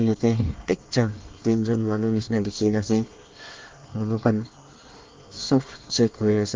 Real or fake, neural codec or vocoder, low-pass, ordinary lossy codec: fake; codec, 24 kHz, 1 kbps, SNAC; 7.2 kHz; Opus, 32 kbps